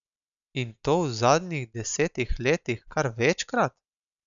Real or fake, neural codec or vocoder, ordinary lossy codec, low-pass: real; none; none; 7.2 kHz